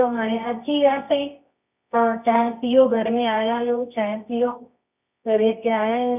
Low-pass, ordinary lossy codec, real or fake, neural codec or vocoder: 3.6 kHz; none; fake; codec, 24 kHz, 0.9 kbps, WavTokenizer, medium music audio release